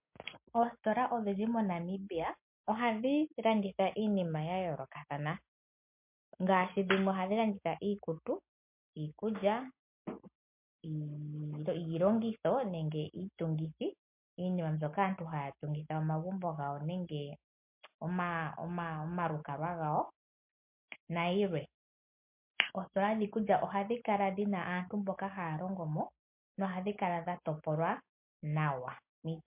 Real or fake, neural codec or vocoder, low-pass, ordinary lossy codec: real; none; 3.6 kHz; MP3, 32 kbps